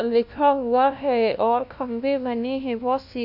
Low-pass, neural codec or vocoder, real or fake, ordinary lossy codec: 5.4 kHz; codec, 16 kHz, 1 kbps, FunCodec, trained on LibriTTS, 50 frames a second; fake; none